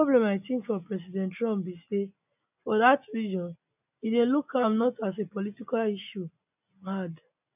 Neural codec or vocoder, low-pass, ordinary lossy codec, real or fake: none; 3.6 kHz; none; real